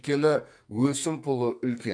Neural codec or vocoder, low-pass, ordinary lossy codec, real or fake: codec, 44.1 kHz, 2.6 kbps, SNAC; 9.9 kHz; MP3, 96 kbps; fake